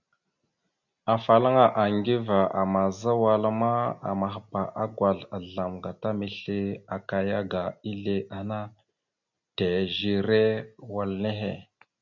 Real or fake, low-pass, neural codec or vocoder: real; 7.2 kHz; none